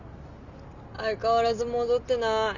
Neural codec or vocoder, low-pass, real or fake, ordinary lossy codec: none; 7.2 kHz; real; none